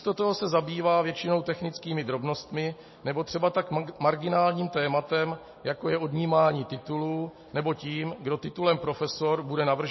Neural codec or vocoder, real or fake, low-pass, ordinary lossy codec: none; real; 7.2 kHz; MP3, 24 kbps